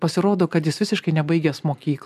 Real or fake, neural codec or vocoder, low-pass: real; none; 14.4 kHz